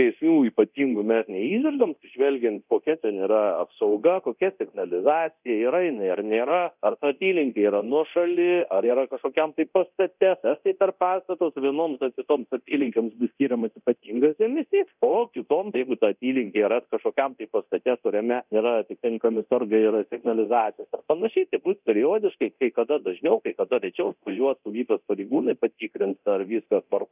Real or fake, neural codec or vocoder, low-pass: fake; codec, 24 kHz, 0.9 kbps, DualCodec; 3.6 kHz